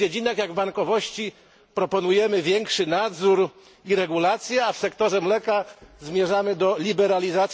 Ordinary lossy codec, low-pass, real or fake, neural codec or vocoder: none; none; real; none